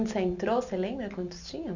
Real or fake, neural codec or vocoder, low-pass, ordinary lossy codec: real; none; 7.2 kHz; none